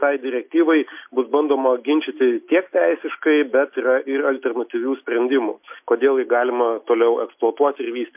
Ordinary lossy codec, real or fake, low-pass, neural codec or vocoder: MP3, 32 kbps; real; 3.6 kHz; none